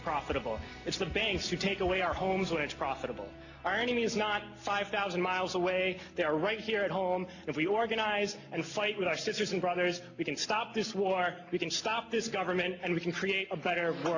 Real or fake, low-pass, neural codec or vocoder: real; 7.2 kHz; none